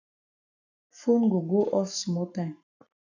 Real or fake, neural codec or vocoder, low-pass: fake; codec, 44.1 kHz, 7.8 kbps, Pupu-Codec; 7.2 kHz